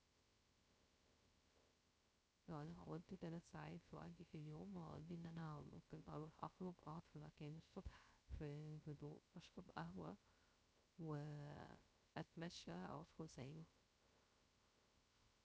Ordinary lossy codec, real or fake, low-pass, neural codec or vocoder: none; fake; none; codec, 16 kHz, 0.3 kbps, FocalCodec